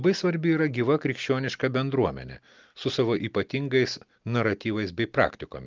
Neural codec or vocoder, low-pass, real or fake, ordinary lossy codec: none; 7.2 kHz; real; Opus, 24 kbps